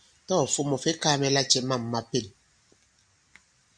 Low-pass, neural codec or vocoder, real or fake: 9.9 kHz; none; real